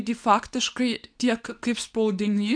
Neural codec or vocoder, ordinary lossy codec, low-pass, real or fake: codec, 24 kHz, 0.9 kbps, WavTokenizer, medium speech release version 2; AAC, 64 kbps; 9.9 kHz; fake